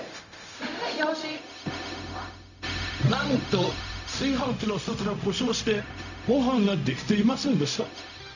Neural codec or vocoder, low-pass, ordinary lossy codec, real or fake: codec, 16 kHz, 0.4 kbps, LongCat-Audio-Codec; 7.2 kHz; none; fake